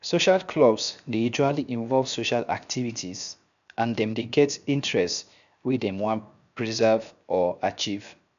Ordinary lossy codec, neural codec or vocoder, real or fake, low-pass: none; codec, 16 kHz, 0.7 kbps, FocalCodec; fake; 7.2 kHz